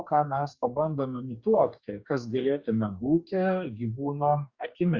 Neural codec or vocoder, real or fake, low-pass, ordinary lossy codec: codec, 44.1 kHz, 2.6 kbps, DAC; fake; 7.2 kHz; Opus, 64 kbps